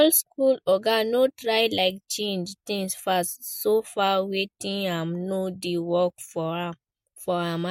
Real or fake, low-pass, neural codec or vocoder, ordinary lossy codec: real; 19.8 kHz; none; MP3, 64 kbps